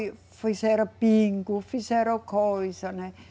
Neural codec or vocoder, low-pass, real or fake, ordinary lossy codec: none; none; real; none